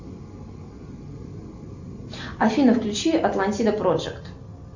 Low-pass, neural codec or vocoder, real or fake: 7.2 kHz; none; real